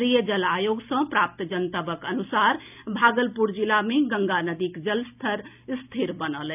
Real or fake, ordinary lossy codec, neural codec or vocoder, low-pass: real; none; none; 3.6 kHz